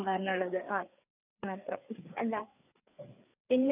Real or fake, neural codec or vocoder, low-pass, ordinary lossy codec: fake; codec, 16 kHz, 4 kbps, FreqCodec, larger model; 3.6 kHz; none